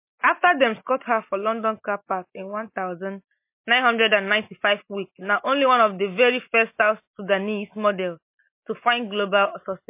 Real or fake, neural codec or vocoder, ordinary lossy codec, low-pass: real; none; MP3, 24 kbps; 3.6 kHz